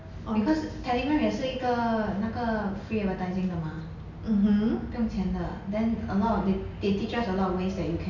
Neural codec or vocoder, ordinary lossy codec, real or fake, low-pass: none; none; real; 7.2 kHz